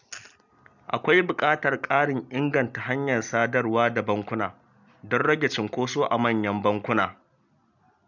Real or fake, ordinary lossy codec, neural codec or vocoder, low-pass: real; none; none; 7.2 kHz